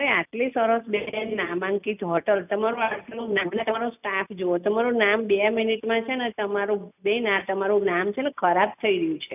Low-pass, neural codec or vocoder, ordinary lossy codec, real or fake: 3.6 kHz; none; none; real